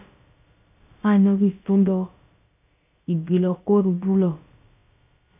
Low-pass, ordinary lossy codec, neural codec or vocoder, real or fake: 3.6 kHz; AAC, 32 kbps; codec, 16 kHz, about 1 kbps, DyCAST, with the encoder's durations; fake